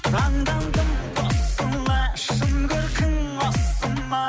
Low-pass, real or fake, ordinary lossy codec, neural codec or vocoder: none; real; none; none